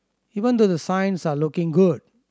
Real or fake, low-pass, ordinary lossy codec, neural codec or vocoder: real; none; none; none